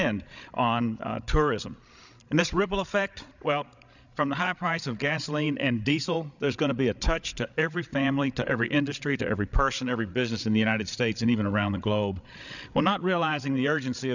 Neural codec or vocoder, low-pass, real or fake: codec, 16 kHz, 16 kbps, FreqCodec, larger model; 7.2 kHz; fake